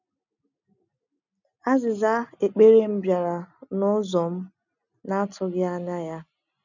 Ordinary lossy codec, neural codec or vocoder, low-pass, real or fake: none; none; 7.2 kHz; real